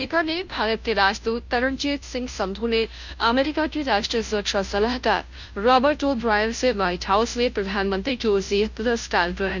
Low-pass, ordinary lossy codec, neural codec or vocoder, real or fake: 7.2 kHz; none; codec, 16 kHz, 0.5 kbps, FunCodec, trained on Chinese and English, 25 frames a second; fake